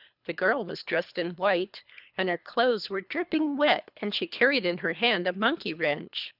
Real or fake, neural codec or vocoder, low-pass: fake; codec, 24 kHz, 3 kbps, HILCodec; 5.4 kHz